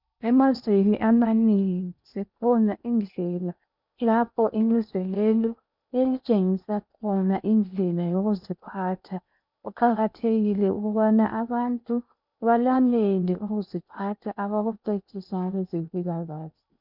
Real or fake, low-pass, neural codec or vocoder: fake; 5.4 kHz; codec, 16 kHz in and 24 kHz out, 0.8 kbps, FocalCodec, streaming, 65536 codes